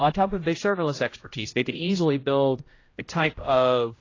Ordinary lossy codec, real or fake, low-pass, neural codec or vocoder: AAC, 32 kbps; fake; 7.2 kHz; codec, 16 kHz, 0.5 kbps, X-Codec, HuBERT features, trained on general audio